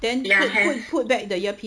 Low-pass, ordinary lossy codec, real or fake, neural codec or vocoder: none; none; real; none